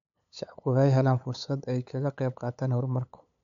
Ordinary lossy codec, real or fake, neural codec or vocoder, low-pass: none; fake; codec, 16 kHz, 8 kbps, FunCodec, trained on LibriTTS, 25 frames a second; 7.2 kHz